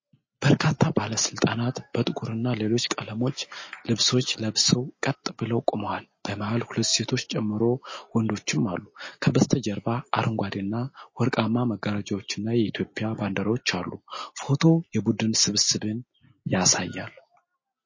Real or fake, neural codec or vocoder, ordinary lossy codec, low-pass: real; none; MP3, 32 kbps; 7.2 kHz